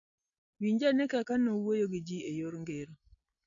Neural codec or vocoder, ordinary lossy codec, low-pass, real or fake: none; none; 7.2 kHz; real